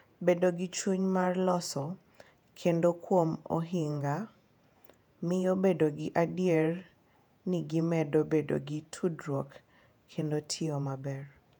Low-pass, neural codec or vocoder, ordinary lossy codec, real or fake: 19.8 kHz; vocoder, 48 kHz, 128 mel bands, Vocos; none; fake